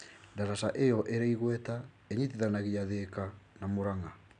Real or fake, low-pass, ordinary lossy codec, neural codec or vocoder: real; 9.9 kHz; none; none